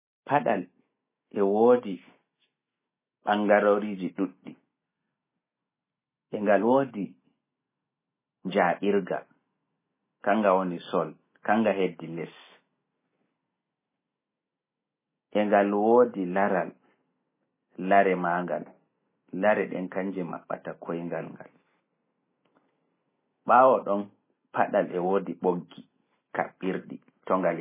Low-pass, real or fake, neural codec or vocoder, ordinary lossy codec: 3.6 kHz; real; none; MP3, 16 kbps